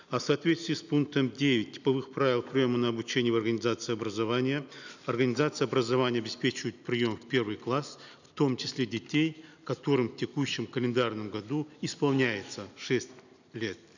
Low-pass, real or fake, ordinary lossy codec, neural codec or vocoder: 7.2 kHz; real; none; none